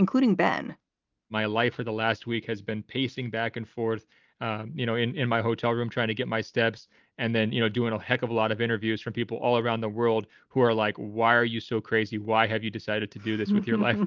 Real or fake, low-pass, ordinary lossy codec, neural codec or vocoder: real; 7.2 kHz; Opus, 24 kbps; none